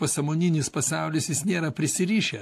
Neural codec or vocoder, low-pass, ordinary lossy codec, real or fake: none; 14.4 kHz; AAC, 64 kbps; real